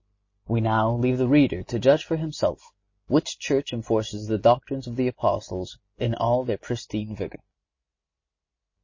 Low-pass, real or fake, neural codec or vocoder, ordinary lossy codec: 7.2 kHz; real; none; MP3, 32 kbps